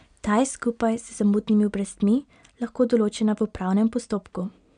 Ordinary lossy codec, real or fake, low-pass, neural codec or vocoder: none; real; 9.9 kHz; none